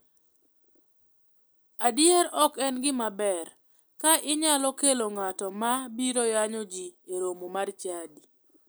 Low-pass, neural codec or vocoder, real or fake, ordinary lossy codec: none; none; real; none